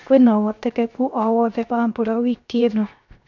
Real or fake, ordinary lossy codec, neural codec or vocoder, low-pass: fake; none; codec, 16 kHz, 0.7 kbps, FocalCodec; 7.2 kHz